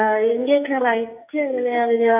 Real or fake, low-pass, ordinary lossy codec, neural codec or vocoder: fake; 3.6 kHz; AAC, 32 kbps; codec, 44.1 kHz, 2.6 kbps, SNAC